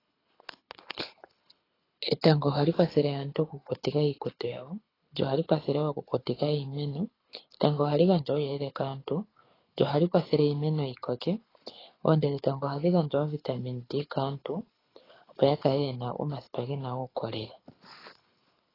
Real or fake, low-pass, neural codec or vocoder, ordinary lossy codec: fake; 5.4 kHz; codec, 24 kHz, 6 kbps, HILCodec; AAC, 24 kbps